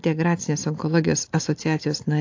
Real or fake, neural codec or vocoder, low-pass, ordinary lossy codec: real; none; 7.2 kHz; AAC, 48 kbps